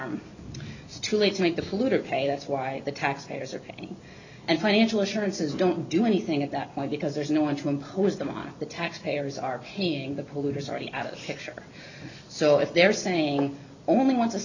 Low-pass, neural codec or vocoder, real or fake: 7.2 kHz; none; real